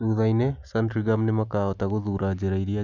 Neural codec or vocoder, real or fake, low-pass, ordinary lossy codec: none; real; 7.2 kHz; none